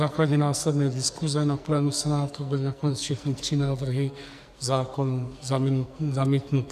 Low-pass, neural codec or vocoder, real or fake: 14.4 kHz; codec, 44.1 kHz, 2.6 kbps, SNAC; fake